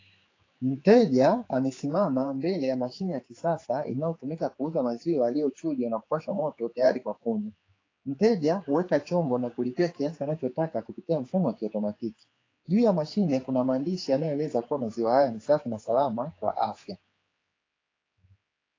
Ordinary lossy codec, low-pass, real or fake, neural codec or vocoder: AAC, 32 kbps; 7.2 kHz; fake; codec, 16 kHz, 4 kbps, X-Codec, HuBERT features, trained on general audio